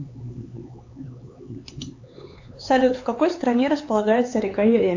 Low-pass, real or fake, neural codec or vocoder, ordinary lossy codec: 7.2 kHz; fake; codec, 16 kHz, 4 kbps, X-Codec, HuBERT features, trained on LibriSpeech; AAC, 48 kbps